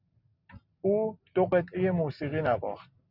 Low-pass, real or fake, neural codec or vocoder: 5.4 kHz; real; none